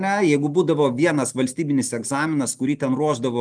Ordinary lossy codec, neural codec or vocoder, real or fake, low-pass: Opus, 32 kbps; none; real; 9.9 kHz